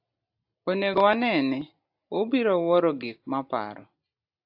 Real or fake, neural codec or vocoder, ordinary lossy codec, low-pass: real; none; AAC, 48 kbps; 5.4 kHz